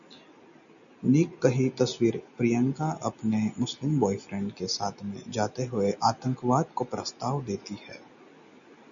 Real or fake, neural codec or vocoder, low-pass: real; none; 7.2 kHz